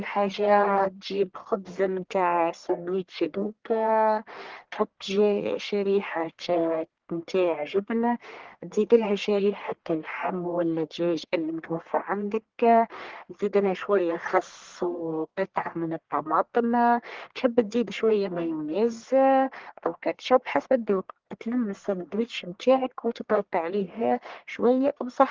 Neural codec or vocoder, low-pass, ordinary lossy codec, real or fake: codec, 44.1 kHz, 1.7 kbps, Pupu-Codec; 7.2 kHz; Opus, 16 kbps; fake